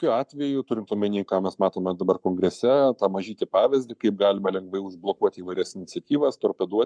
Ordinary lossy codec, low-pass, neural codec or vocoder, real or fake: MP3, 96 kbps; 9.9 kHz; codec, 44.1 kHz, 7.8 kbps, Pupu-Codec; fake